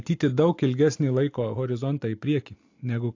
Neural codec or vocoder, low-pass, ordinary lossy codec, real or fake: none; 7.2 kHz; AAC, 48 kbps; real